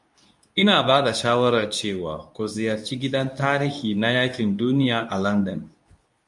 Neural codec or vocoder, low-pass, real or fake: codec, 24 kHz, 0.9 kbps, WavTokenizer, medium speech release version 2; 10.8 kHz; fake